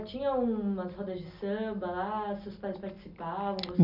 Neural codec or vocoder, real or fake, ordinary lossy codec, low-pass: none; real; none; 5.4 kHz